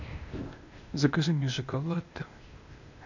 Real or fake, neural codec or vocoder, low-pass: fake; codec, 16 kHz, 0.8 kbps, ZipCodec; 7.2 kHz